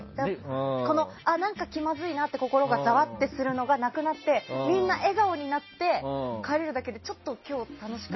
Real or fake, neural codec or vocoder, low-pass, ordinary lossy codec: real; none; 7.2 kHz; MP3, 24 kbps